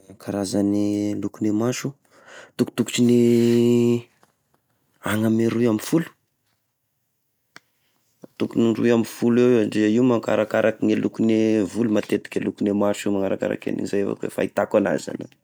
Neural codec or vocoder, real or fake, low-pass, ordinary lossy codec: none; real; none; none